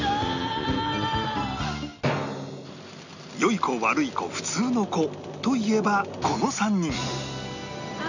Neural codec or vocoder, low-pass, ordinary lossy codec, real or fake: none; 7.2 kHz; none; real